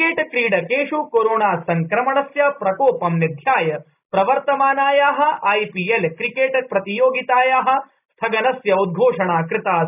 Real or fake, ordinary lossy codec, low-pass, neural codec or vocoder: real; none; 3.6 kHz; none